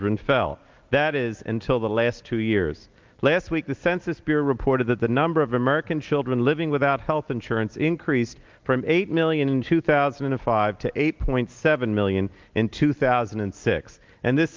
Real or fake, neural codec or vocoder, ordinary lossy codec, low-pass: real; none; Opus, 32 kbps; 7.2 kHz